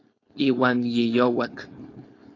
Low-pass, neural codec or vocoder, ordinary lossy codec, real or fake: 7.2 kHz; codec, 16 kHz, 4.8 kbps, FACodec; AAC, 32 kbps; fake